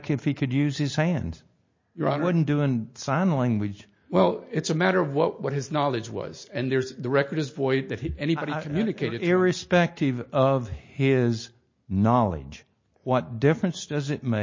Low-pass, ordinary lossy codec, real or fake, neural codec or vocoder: 7.2 kHz; MP3, 32 kbps; real; none